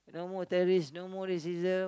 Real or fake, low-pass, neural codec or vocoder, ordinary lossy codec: real; none; none; none